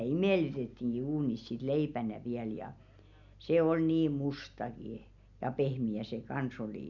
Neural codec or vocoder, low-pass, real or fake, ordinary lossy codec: none; 7.2 kHz; real; none